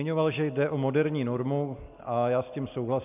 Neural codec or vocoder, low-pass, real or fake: none; 3.6 kHz; real